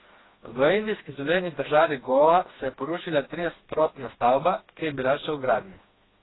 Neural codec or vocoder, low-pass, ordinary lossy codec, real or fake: codec, 16 kHz, 2 kbps, FreqCodec, smaller model; 7.2 kHz; AAC, 16 kbps; fake